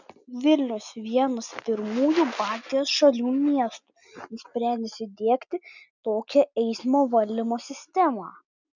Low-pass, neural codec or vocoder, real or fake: 7.2 kHz; none; real